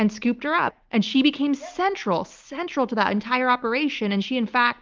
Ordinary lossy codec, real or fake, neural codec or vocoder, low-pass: Opus, 24 kbps; real; none; 7.2 kHz